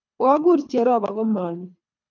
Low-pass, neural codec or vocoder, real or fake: 7.2 kHz; codec, 24 kHz, 3 kbps, HILCodec; fake